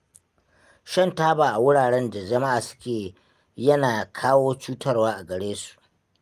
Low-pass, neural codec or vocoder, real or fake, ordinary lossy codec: 14.4 kHz; none; real; Opus, 32 kbps